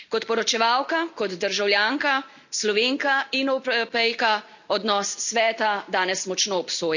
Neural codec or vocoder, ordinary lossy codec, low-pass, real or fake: none; none; 7.2 kHz; real